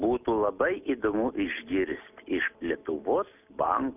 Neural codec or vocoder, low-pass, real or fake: none; 3.6 kHz; real